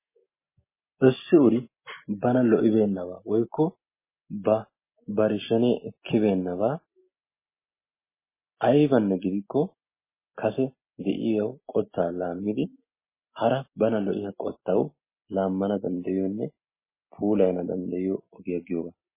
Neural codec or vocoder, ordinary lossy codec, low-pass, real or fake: none; MP3, 16 kbps; 3.6 kHz; real